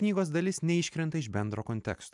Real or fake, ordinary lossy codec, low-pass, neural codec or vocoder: real; MP3, 96 kbps; 10.8 kHz; none